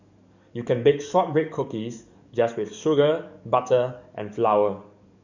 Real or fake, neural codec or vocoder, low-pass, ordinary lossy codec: fake; codec, 44.1 kHz, 7.8 kbps, DAC; 7.2 kHz; none